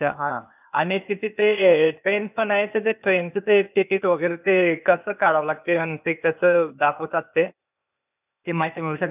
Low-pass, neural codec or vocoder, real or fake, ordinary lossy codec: 3.6 kHz; codec, 16 kHz, 0.8 kbps, ZipCodec; fake; none